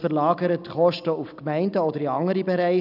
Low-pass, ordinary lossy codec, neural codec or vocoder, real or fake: 5.4 kHz; none; none; real